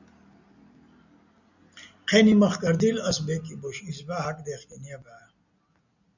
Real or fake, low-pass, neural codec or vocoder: real; 7.2 kHz; none